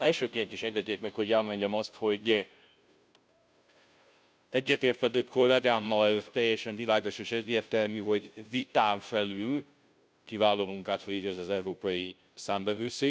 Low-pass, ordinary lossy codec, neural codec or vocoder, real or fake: none; none; codec, 16 kHz, 0.5 kbps, FunCodec, trained on Chinese and English, 25 frames a second; fake